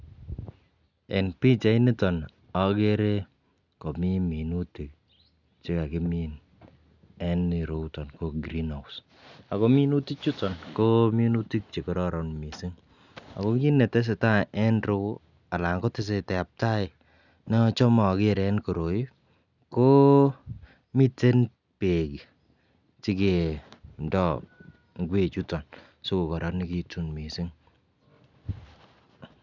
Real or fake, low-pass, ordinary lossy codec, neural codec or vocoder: real; 7.2 kHz; none; none